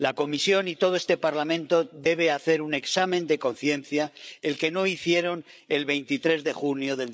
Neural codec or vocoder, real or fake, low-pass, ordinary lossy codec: codec, 16 kHz, 8 kbps, FreqCodec, larger model; fake; none; none